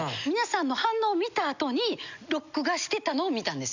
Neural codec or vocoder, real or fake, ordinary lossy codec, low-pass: none; real; none; 7.2 kHz